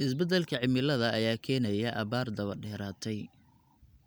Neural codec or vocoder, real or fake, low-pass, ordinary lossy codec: none; real; none; none